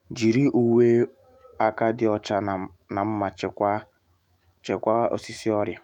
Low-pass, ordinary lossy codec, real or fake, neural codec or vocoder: 19.8 kHz; none; fake; autoencoder, 48 kHz, 128 numbers a frame, DAC-VAE, trained on Japanese speech